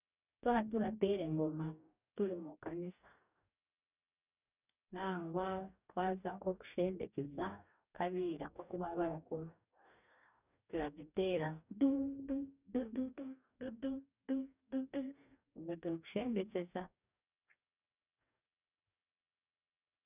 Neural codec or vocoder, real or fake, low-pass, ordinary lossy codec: codec, 44.1 kHz, 2.6 kbps, DAC; fake; 3.6 kHz; none